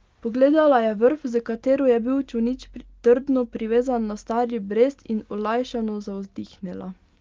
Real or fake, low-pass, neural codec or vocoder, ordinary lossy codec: real; 7.2 kHz; none; Opus, 24 kbps